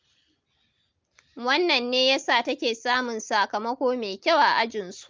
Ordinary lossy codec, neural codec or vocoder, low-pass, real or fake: Opus, 24 kbps; none; 7.2 kHz; real